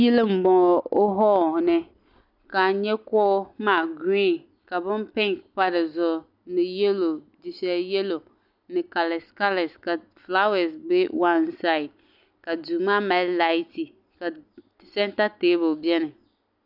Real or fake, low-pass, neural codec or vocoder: real; 5.4 kHz; none